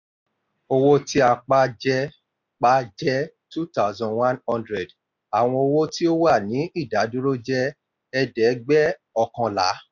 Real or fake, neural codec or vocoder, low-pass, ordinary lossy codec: real; none; 7.2 kHz; none